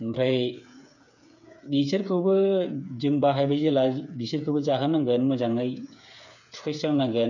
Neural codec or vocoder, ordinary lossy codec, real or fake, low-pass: codec, 16 kHz, 8 kbps, FreqCodec, smaller model; none; fake; 7.2 kHz